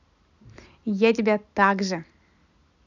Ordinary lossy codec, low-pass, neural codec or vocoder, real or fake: none; 7.2 kHz; none; real